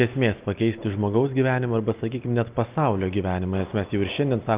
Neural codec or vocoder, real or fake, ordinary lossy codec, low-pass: none; real; Opus, 24 kbps; 3.6 kHz